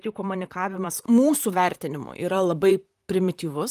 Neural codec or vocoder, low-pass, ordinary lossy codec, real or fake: vocoder, 44.1 kHz, 128 mel bands, Pupu-Vocoder; 14.4 kHz; Opus, 32 kbps; fake